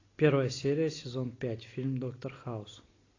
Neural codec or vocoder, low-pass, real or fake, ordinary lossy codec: none; 7.2 kHz; real; AAC, 32 kbps